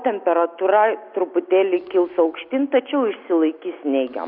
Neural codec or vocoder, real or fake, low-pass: none; real; 5.4 kHz